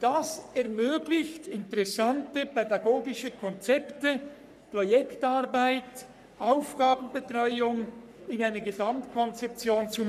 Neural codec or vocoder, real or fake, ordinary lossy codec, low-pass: codec, 44.1 kHz, 3.4 kbps, Pupu-Codec; fake; none; 14.4 kHz